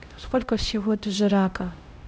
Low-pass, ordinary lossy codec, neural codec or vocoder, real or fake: none; none; codec, 16 kHz, 0.5 kbps, X-Codec, HuBERT features, trained on LibriSpeech; fake